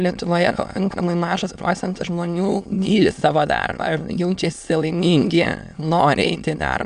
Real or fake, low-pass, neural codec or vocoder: fake; 9.9 kHz; autoencoder, 22.05 kHz, a latent of 192 numbers a frame, VITS, trained on many speakers